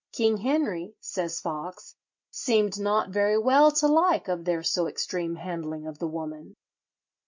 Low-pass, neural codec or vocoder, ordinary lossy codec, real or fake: 7.2 kHz; none; MP3, 48 kbps; real